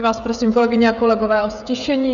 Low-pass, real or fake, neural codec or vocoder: 7.2 kHz; fake; codec, 16 kHz, 16 kbps, FreqCodec, smaller model